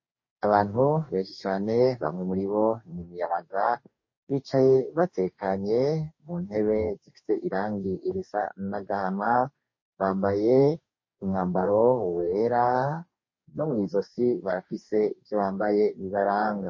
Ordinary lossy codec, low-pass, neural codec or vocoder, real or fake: MP3, 32 kbps; 7.2 kHz; codec, 44.1 kHz, 2.6 kbps, DAC; fake